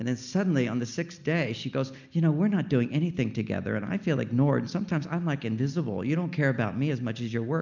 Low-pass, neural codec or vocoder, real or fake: 7.2 kHz; none; real